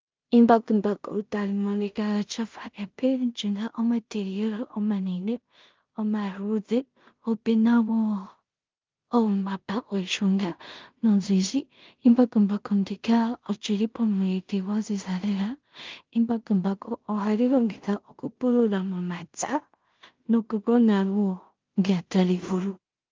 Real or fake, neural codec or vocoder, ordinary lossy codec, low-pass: fake; codec, 16 kHz in and 24 kHz out, 0.4 kbps, LongCat-Audio-Codec, two codebook decoder; Opus, 32 kbps; 7.2 kHz